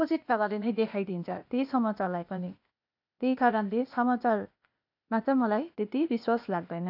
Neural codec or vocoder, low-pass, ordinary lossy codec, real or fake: codec, 16 kHz, 0.8 kbps, ZipCodec; 5.4 kHz; AAC, 48 kbps; fake